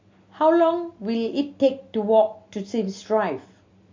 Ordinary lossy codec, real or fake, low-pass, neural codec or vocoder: MP3, 48 kbps; real; 7.2 kHz; none